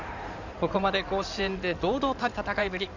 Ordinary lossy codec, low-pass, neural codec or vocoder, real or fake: none; 7.2 kHz; codec, 16 kHz in and 24 kHz out, 2.2 kbps, FireRedTTS-2 codec; fake